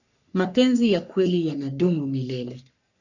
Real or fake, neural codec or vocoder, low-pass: fake; codec, 44.1 kHz, 3.4 kbps, Pupu-Codec; 7.2 kHz